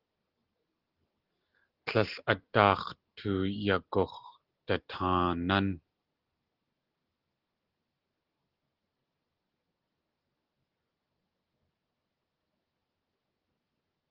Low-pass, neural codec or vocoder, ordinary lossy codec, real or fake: 5.4 kHz; none; Opus, 16 kbps; real